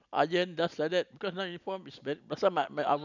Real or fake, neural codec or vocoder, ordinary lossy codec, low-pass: real; none; none; 7.2 kHz